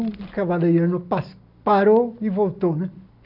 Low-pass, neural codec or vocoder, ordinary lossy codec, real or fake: 5.4 kHz; none; none; real